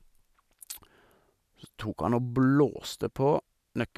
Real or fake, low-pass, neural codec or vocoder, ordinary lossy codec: real; 14.4 kHz; none; none